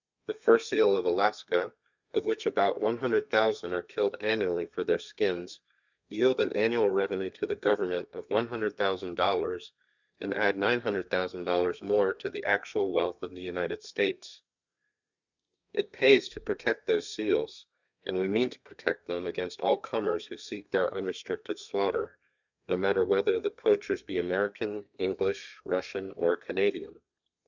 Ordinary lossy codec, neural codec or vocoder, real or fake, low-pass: Opus, 64 kbps; codec, 32 kHz, 1.9 kbps, SNAC; fake; 7.2 kHz